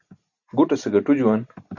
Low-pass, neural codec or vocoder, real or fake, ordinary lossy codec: 7.2 kHz; none; real; Opus, 64 kbps